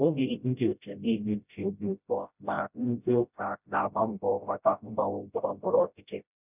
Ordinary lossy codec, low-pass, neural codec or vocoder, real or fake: none; 3.6 kHz; codec, 16 kHz, 0.5 kbps, FreqCodec, smaller model; fake